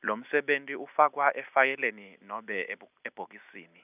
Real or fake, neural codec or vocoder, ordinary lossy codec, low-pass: real; none; none; 3.6 kHz